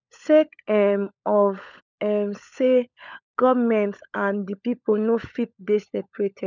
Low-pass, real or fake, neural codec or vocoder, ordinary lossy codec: 7.2 kHz; fake; codec, 16 kHz, 16 kbps, FunCodec, trained on LibriTTS, 50 frames a second; none